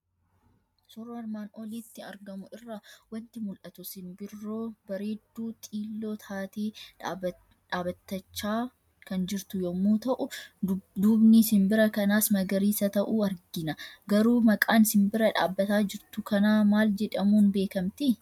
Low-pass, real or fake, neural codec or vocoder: 19.8 kHz; real; none